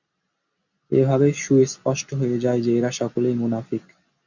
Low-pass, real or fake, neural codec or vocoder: 7.2 kHz; real; none